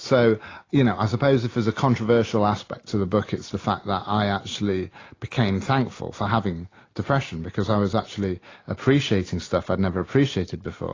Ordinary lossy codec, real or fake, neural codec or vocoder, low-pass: AAC, 32 kbps; real; none; 7.2 kHz